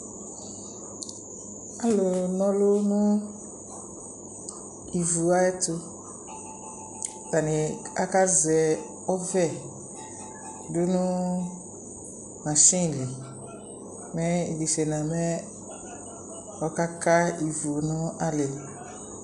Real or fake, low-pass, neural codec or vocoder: real; 10.8 kHz; none